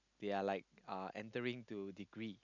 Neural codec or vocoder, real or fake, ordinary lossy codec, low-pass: none; real; none; 7.2 kHz